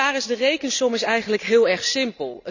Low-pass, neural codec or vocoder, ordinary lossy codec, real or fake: 7.2 kHz; none; none; real